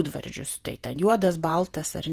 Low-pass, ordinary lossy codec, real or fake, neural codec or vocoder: 14.4 kHz; Opus, 24 kbps; fake; vocoder, 48 kHz, 128 mel bands, Vocos